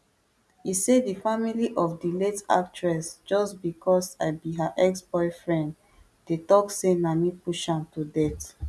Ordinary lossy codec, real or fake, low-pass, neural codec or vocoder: none; real; none; none